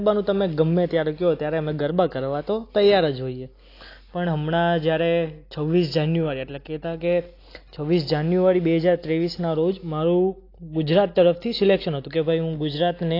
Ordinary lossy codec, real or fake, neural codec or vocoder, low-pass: AAC, 32 kbps; real; none; 5.4 kHz